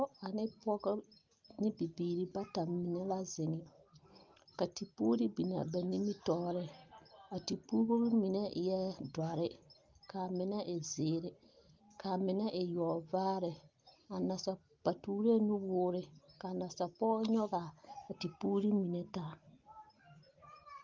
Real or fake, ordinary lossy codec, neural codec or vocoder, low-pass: real; Opus, 32 kbps; none; 7.2 kHz